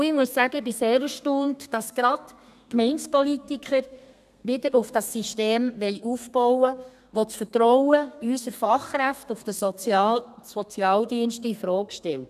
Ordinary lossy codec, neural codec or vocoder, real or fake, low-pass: none; codec, 32 kHz, 1.9 kbps, SNAC; fake; 14.4 kHz